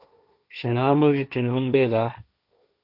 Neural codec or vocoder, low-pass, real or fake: codec, 16 kHz, 1.1 kbps, Voila-Tokenizer; 5.4 kHz; fake